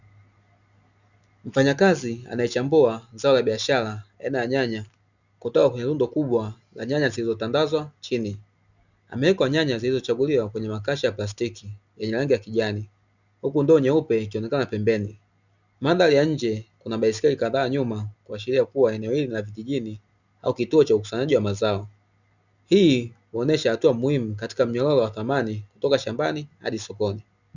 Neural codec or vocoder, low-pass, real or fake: none; 7.2 kHz; real